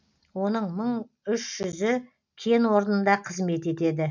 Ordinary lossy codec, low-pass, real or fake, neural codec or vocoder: none; 7.2 kHz; real; none